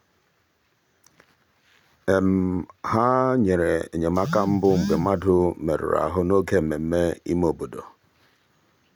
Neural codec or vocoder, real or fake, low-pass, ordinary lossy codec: none; real; 19.8 kHz; none